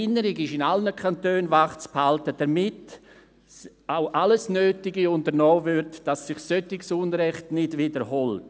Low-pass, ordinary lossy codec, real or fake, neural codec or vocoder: none; none; real; none